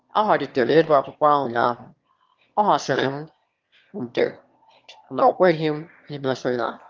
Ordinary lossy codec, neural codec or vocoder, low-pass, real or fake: Opus, 32 kbps; autoencoder, 22.05 kHz, a latent of 192 numbers a frame, VITS, trained on one speaker; 7.2 kHz; fake